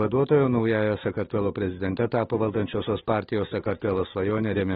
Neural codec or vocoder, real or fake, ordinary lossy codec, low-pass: autoencoder, 48 kHz, 128 numbers a frame, DAC-VAE, trained on Japanese speech; fake; AAC, 16 kbps; 19.8 kHz